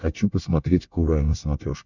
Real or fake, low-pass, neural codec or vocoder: fake; 7.2 kHz; codec, 24 kHz, 1 kbps, SNAC